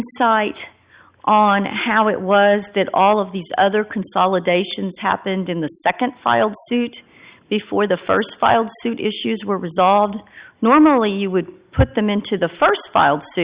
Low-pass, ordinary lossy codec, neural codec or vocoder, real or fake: 3.6 kHz; Opus, 64 kbps; none; real